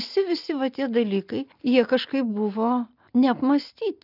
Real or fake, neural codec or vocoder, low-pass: real; none; 5.4 kHz